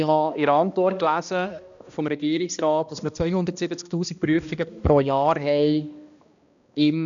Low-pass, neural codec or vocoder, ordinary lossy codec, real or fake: 7.2 kHz; codec, 16 kHz, 1 kbps, X-Codec, HuBERT features, trained on balanced general audio; none; fake